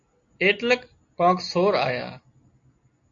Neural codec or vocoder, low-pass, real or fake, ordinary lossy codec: none; 7.2 kHz; real; AAC, 48 kbps